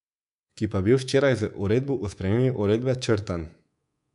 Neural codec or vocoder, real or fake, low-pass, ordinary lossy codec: codec, 24 kHz, 3.1 kbps, DualCodec; fake; 10.8 kHz; none